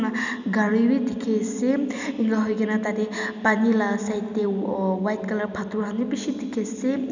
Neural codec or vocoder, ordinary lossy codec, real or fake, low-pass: none; none; real; 7.2 kHz